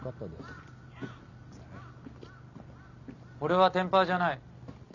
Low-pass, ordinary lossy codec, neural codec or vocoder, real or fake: 7.2 kHz; none; none; real